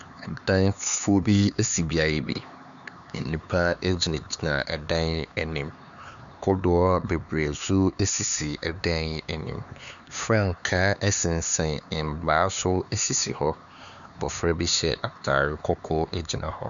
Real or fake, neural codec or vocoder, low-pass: fake; codec, 16 kHz, 4 kbps, X-Codec, HuBERT features, trained on LibriSpeech; 7.2 kHz